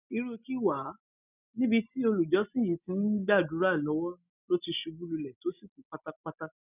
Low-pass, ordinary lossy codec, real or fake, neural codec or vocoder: 3.6 kHz; none; real; none